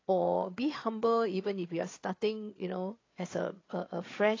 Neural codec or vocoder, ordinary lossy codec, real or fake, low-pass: codec, 16 kHz, 8 kbps, FreqCodec, larger model; AAC, 32 kbps; fake; 7.2 kHz